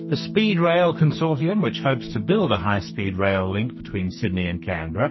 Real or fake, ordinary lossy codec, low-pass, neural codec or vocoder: fake; MP3, 24 kbps; 7.2 kHz; codec, 44.1 kHz, 2.6 kbps, SNAC